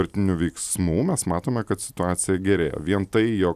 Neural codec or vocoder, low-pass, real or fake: none; 14.4 kHz; real